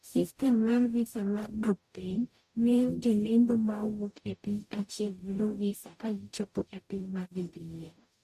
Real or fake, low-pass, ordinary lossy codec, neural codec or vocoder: fake; 14.4 kHz; MP3, 64 kbps; codec, 44.1 kHz, 0.9 kbps, DAC